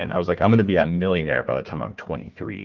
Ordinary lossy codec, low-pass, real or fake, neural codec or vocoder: Opus, 32 kbps; 7.2 kHz; fake; codec, 16 kHz, 1 kbps, FunCodec, trained on Chinese and English, 50 frames a second